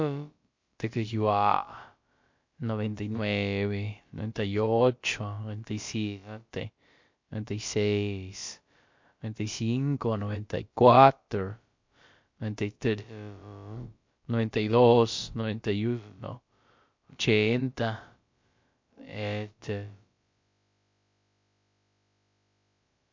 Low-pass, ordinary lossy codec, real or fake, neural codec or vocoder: 7.2 kHz; MP3, 48 kbps; fake; codec, 16 kHz, about 1 kbps, DyCAST, with the encoder's durations